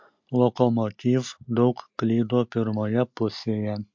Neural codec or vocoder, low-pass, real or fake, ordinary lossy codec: none; 7.2 kHz; real; MP3, 48 kbps